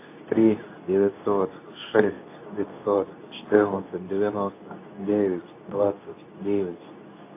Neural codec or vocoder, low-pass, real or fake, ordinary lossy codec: codec, 24 kHz, 0.9 kbps, WavTokenizer, medium speech release version 2; 3.6 kHz; fake; AAC, 24 kbps